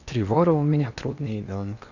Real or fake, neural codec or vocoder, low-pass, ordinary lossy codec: fake; codec, 16 kHz in and 24 kHz out, 0.8 kbps, FocalCodec, streaming, 65536 codes; 7.2 kHz; none